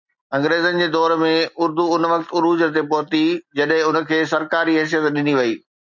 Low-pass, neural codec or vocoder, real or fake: 7.2 kHz; none; real